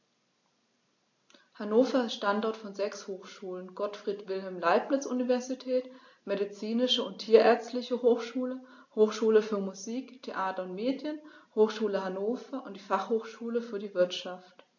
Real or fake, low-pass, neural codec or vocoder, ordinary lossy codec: real; 7.2 kHz; none; AAC, 48 kbps